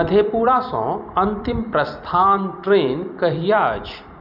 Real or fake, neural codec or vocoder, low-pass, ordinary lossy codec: fake; vocoder, 44.1 kHz, 128 mel bands every 256 samples, BigVGAN v2; 5.4 kHz; none